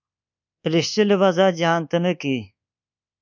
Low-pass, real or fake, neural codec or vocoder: 7.2 kHz; fake; codec, 24 kHz, 1.2 kbps, DualCodec